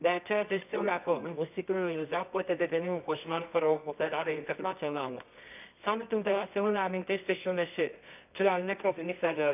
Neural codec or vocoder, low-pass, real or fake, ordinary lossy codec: codec, 24 kHz, 0.9 kbps, WavTokenizer, medium music audio release; 3.6 kHz; fake; none